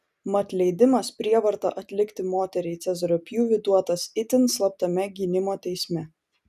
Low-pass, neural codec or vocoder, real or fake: 14.4 kHz; none; real